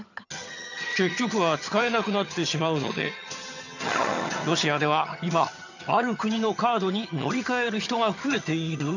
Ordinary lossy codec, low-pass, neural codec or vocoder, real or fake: none; 7.2 kHz; vocoder, 22.05 kHz, 80 mel bands, HiFi-GAN; fake